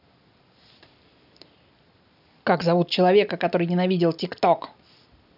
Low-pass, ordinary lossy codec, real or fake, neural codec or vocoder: 5.4 kHz; none; real; none